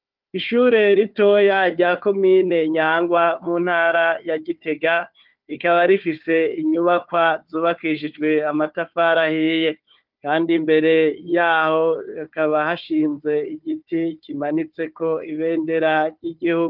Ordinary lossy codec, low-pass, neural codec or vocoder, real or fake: Opus, 24 kbps; 5.4 kHz; codec, 16 kHz, 4 kbps, FunCodec, trained on Chinese and English, 50 frames a second; fake